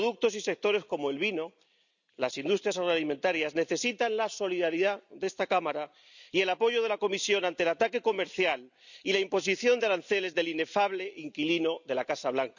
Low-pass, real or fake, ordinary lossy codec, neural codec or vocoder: 7.2 kHz; real; none; none